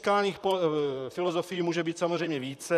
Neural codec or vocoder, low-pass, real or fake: vocoder, 44.1 kHz, 128 mel bands, Pupu-Vocoder; 14.4 kHz; fake